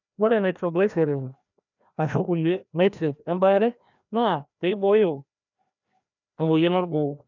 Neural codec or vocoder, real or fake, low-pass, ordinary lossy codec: codec, 16 kHz, 1 kbps, FreqCodec, larger model; fake; 7.2 kHz; none